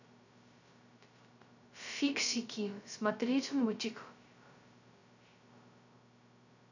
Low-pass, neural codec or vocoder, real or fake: 7.2 kHz; codec, 16 kHz, 0.2 kbps, FocalCodec; fake